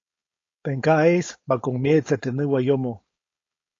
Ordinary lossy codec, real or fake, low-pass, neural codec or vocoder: AAC, 32 kbps; fake; 7.2 kHz; codec, 16 kHz, 4.8 kbps, FACodec